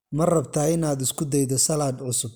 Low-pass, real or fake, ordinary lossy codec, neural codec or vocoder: none; real; none; none